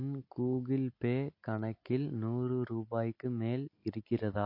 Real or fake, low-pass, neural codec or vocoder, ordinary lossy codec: real; 5.4 kHz; none; MP3, 32 kbps